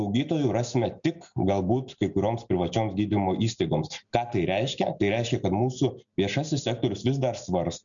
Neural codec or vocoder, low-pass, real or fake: none; 7.2 kHz; real